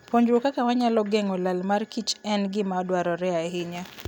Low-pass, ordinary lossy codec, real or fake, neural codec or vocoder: none; none; real; none